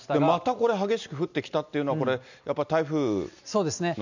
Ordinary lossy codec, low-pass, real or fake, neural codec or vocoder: none; 7.2 kHz; real; none